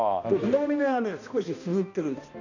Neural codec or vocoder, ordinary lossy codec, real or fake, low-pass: codec, 16 kHz, 1 kbps, X-Codec, HuBERT features, trained on balanced general audio; none; fake; 7.2 kHz